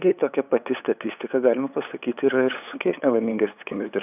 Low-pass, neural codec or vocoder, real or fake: 3.6 kHz; codec, 16 kHz, 8 kbps, FunCodec, trained on LibriTTS, 25 frames a second; fake